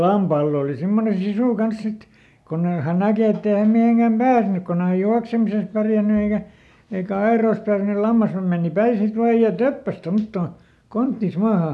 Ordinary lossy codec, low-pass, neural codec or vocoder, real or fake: none; none; none; real